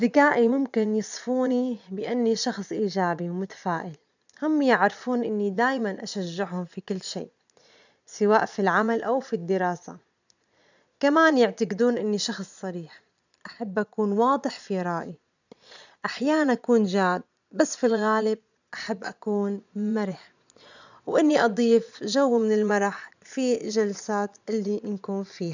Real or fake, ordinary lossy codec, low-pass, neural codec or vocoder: fake; none; 7.2 kHz; vocoder, 22.05 kHz, 80 mel bands, Vocos